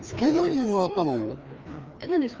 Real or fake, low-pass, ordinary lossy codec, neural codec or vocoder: fake; 7.2 kHz; Opus, 24 kbps; codec, 16 kHz, 2 kbps, FreqCodec, larger model